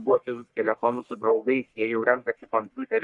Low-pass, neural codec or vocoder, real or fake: 10.8 kHz; codec, 44.1 kHz, 1.7 kbps, Pupu-Codec; fake